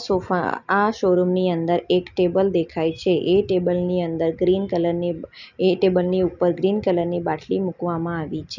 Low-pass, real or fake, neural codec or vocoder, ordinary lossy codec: 7.2 kHz; real; none; none